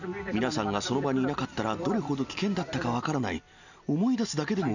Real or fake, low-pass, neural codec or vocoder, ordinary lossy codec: real; 7.2 kHz; none; none